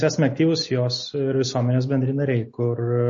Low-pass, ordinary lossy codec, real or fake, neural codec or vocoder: 7.2 kHz; MP3, 32 kbps; real; none